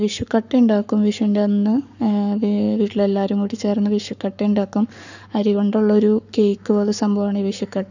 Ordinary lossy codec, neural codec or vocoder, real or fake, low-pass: none; codec, 44.1 kHz, 7.8 kbps, Pupu-Codec; fake; 7.2 kHz